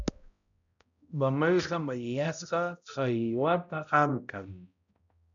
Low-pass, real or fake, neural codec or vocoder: 7.2 kHz; fake; codec, 16 kHz, 0.5 kbps, X-Codec, HuBERT features, trained on balanced general audio